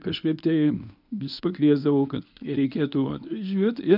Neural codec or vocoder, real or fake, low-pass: codec, 24 kHz, 0.9 kbps, WavTokenizer, medium speech release version 1; fake; 5.4 kHz